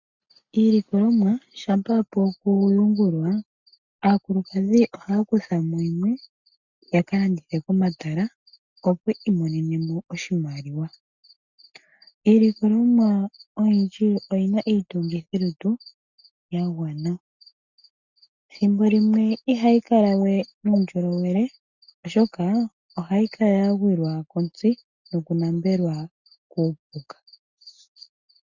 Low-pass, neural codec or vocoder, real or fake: 7.2 kHz; none; real